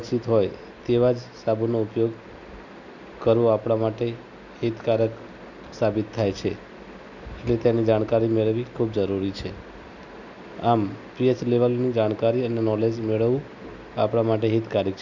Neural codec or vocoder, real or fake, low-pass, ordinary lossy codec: none; real; 7.2 kHz; none